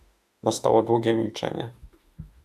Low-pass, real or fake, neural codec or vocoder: 14.4 kHz; fake; autoencoder, 48 kHz, 32 numbers a frame, DAC-VAE, trained on Japanese speech